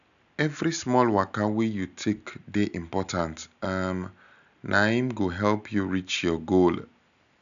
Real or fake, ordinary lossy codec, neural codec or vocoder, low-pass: real; none; none; 7.2 kHz